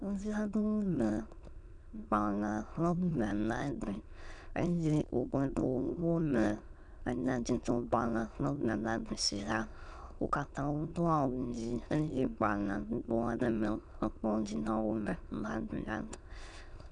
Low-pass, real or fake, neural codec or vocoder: 9.9 kHz; fake; autoencoder, 22.05 kHz, a latent of 192 numbers a frame, VITS, trained on many speakers